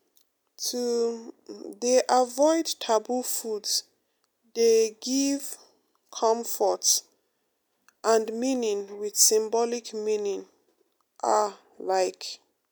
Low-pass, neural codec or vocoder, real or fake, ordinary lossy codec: none; none; real; none